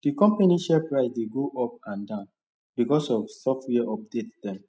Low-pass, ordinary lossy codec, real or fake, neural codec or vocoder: none; none; real; none